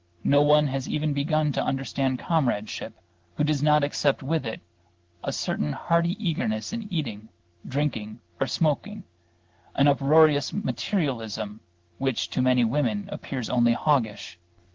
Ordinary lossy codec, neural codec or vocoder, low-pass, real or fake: Opus, 16 kbps; none; 7.2 kHz; real